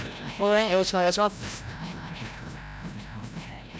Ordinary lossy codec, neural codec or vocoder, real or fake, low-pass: none; codec, 16 kHz, 0.5 kbps, FreqCodec, larger model; fake; none